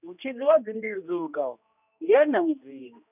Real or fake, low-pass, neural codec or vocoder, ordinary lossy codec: fake; 3.6 kHz; codec, 16 kHz, 1 kbps, X-Codec, HuBERT features, trained on general audio; none